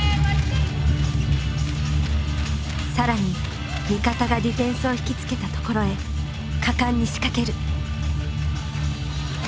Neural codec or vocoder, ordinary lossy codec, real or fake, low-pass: none; none; real; none